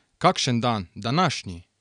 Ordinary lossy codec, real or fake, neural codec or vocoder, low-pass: none; real; none; 9.9 kHz